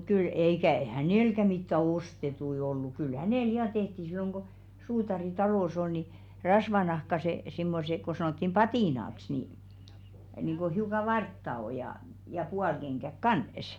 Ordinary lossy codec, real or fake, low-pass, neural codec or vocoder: none; real; 19.8 kHz; none